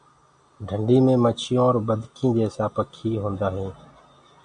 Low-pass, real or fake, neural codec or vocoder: 9.9 kHz; real; none